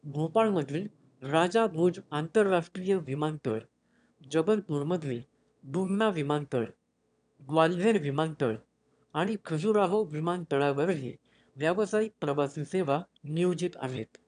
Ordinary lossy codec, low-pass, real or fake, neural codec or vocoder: none; 9.9 kHz; fake; autoencoder, 22.05 kHz, a latent of 192 numbers a frame, VITS, trained on one speaker